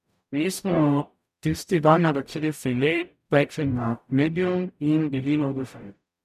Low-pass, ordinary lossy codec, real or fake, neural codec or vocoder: 14.4 kHz; none; fake; codec, 44.1 kHz, 0.9 kbps, DAC